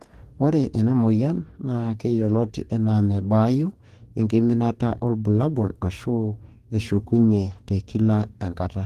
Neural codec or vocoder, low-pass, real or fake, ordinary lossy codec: codec, 44.1 kHz, 2.6 kbps, DAC; 14.4 kHz; fake; Opus, 24 kbps